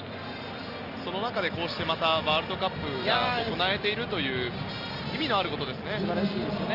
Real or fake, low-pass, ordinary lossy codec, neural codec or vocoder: real; 5.4 kHz; Opus, 32 kbps; none